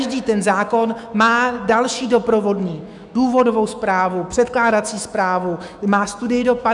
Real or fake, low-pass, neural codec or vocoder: fake; 10.8 kHz; autoencoder, 48 kHz, 128 numbers a frame, DAC-VAE, trained on Japanese speech